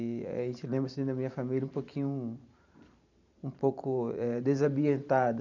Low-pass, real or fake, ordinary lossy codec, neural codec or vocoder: 7.2 kHz; fake; none; vocoder, 44.1 kHz, 128 mel bands every 512 samples, BigVGAN v2